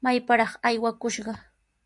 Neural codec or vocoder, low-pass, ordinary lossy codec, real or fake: none; 10.8 kHz; MP3, 64 kbps; real